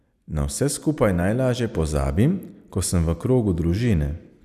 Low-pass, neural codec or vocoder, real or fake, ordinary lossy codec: 14.4 kHz; none; real; none